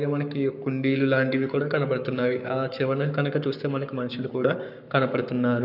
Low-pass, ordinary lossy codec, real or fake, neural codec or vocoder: 5.4 kHz; none; fake; codec, 44.1 kHz, 7.8 kbps, Pupu-Codec